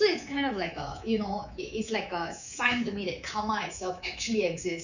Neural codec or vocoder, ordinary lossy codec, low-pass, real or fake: codec, 24 kHz, 3.1 kbps, DualCodec; none; 7.2 kHz; fake